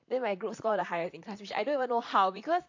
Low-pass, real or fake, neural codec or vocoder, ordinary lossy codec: 7.2 kHz; fake; vocoder, 44.1 kHz, 128 mel bands, Pupu-Vocoder; AAC, 48 kbps